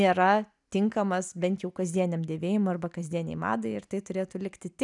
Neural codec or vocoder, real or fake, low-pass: none; real; 10.8 kHz